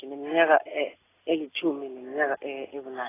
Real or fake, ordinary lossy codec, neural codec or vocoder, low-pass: real; AAC, 16 kbps; none; 3.6 kHz